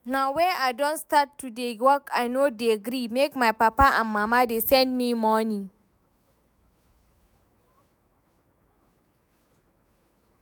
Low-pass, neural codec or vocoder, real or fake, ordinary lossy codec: none; autoencoder, 48 kHz, 128 numbers a frame, DAC-VAE, trained on Japanese speech; fake; none